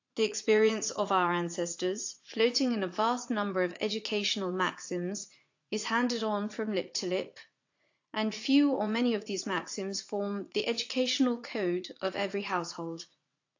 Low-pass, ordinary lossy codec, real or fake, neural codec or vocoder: 7.2 kHz; AAC, 48 kbps; fake; vocoder, 44.1 kHz, 80 mel bands, Vocos